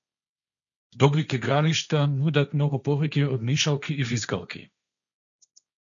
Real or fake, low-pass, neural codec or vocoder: fake; 7.2 kHz; codec, 16 kHz, 1.1 kbps, Voila-Tokenizer